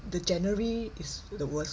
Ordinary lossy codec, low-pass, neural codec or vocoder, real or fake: none; none; none; real